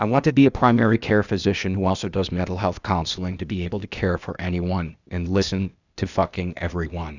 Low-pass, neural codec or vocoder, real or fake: 7.2 kHz; codec, 16 kHz, 0.8 kbps, ZipCodec; fake